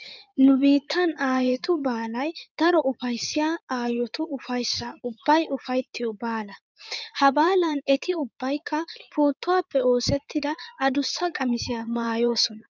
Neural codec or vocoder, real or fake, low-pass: codec, 16 kHz in and 24 kHz out, 2.2 kbps, FireRedTTS-2 codec; fake; 7.2 kHz